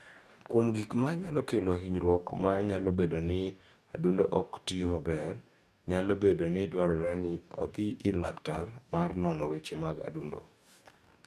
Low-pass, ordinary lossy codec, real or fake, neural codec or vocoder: 14.4 kHz; none; fake; codec, 44.1 kHz, 2.6 kbps, DAC